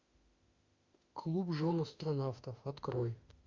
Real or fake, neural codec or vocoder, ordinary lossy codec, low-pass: fake; autoencoder, 48 kHz, 32 numbers a frame, DAC-VAE, trained on Japanese speech; Opus, 64 kbps; 7.2 kHz